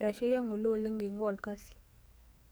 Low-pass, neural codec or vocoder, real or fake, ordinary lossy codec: none; codec, 44.1 kHz, 2.6 kbps, SNAC; fake; none